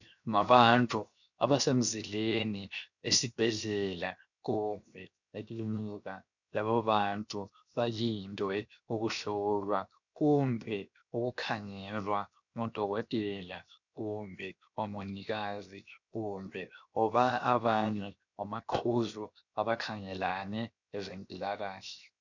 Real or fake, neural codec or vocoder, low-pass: fake; codec, 16 kHz, 0.7 kbps, FocalCodec; 7.2 kHz